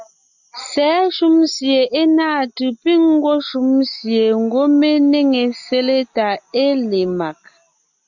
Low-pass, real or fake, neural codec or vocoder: 7.2 kHz; real; none